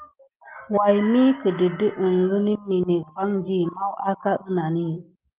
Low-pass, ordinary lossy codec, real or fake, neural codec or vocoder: 3.6 kHz; Opus, 32 kbps; real; none